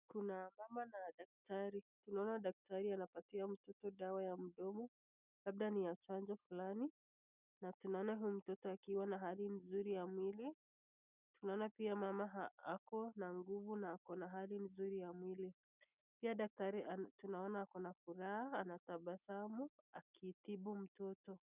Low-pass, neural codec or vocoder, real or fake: 3.6 kHz; none; real